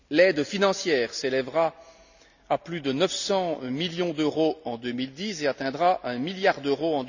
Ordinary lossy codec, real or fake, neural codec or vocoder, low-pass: none; real; none; 7.2 kHz